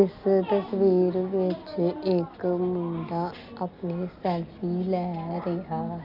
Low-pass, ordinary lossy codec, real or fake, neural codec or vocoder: 5.4 kHz; none; real; none